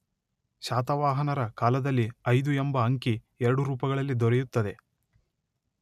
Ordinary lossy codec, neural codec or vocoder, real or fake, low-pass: none; none; real; 14.4 kHz